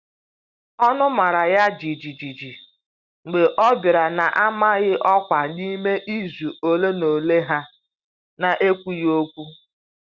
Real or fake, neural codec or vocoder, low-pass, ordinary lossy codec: real; none; 7.2 kHz; Opus, 64 kbps